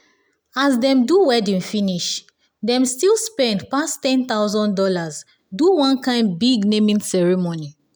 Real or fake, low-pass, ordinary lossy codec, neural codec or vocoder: real; none; none; none